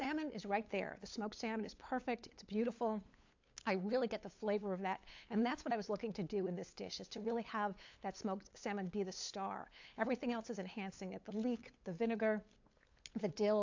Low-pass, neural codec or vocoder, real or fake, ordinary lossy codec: 7.2 kHz; codec, 16 kHz, 8 kbps, FunCodec, trained on LibriTTS, 25 frames a second; fake; AAC, 48 kbps